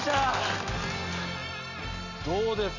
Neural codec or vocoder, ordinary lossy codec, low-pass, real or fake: none; none; 7.2 kHz; real